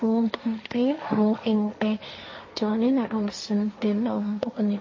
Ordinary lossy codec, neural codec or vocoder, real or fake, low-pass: MP3, 32 kbps; codec, 16 kHz, 1.1 kbps, Voila-Tokenizer; fake; 7.2 kHz